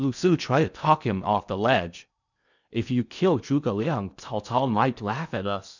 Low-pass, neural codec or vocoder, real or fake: 7.2 kHz; codec, 16 kHz in and 24 kHz out, 0.6 kbps, FocalCodec, streaming, 2048 codes; fake